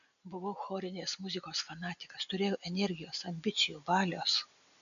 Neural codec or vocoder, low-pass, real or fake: none; 7.2 kHz; real